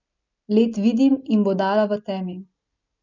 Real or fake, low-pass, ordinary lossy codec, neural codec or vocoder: real; 7.2 kHz; none; none